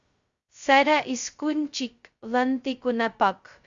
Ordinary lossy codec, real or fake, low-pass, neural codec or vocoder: Opus, 64 kbps; fake; 7.2 kHz; codec, 16 kHz, 0.2 kbps, FocalCodec